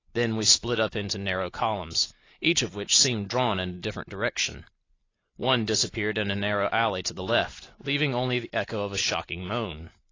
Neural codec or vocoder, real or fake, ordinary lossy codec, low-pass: none; real; AAC, 32 kbps; 7.2 kHz